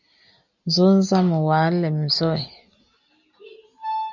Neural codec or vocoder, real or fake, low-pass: none; real; 7.2 kHz